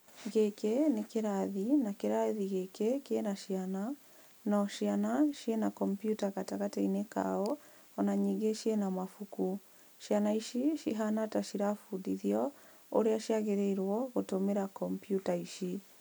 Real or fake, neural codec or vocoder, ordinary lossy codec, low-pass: real; none; none; none